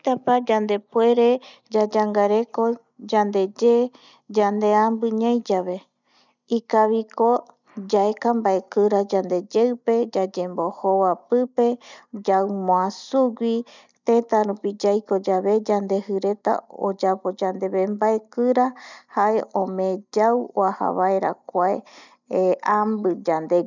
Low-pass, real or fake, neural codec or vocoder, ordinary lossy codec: 7.2 kHz; real; none; none